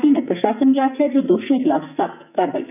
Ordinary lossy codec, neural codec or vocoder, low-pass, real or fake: none; codec, 44.1 kHz, 2.6 kbps, SNAC; 3.6 kHz; fake